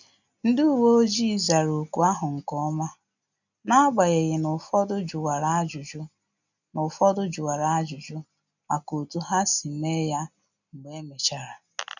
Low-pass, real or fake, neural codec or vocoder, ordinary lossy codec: 7.2 kHz; real; none; none